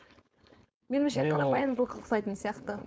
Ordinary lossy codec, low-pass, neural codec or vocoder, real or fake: none; none; codec, 16 kHz, 4.8 kbps, FACodec; fake